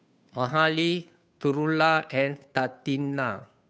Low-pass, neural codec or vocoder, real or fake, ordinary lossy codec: none; codec, 16 kHz, 2 kbps, FunCodec, trained on Chinese and English, 25 frames a second; fake; none